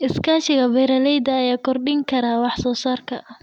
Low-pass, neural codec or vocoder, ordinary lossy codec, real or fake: 19.8 kHz; none; none; real